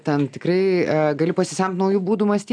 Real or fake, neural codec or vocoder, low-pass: real; none; 9.9 kHz